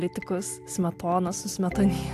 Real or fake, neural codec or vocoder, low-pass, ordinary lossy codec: real; none; 14.4 kHz; AAC, 64 kbps